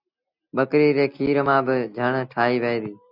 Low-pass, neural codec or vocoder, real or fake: 5.4 kHz; none; real